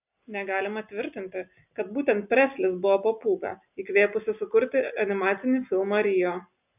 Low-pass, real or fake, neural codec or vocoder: 3.6 kHz; real; none